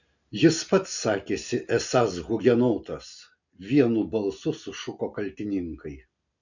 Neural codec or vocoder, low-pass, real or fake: none; 7.2 kHz; real